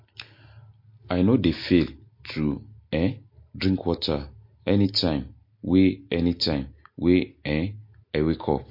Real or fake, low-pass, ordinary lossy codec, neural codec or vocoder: real; 5.4 kHz; MP3, 32 kbps; none